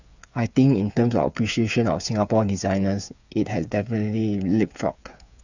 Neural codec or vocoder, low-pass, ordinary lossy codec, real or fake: codec, 16 kHz, 8 kbps, FreqCodec, smaller model; 7.2 kHz; none; fake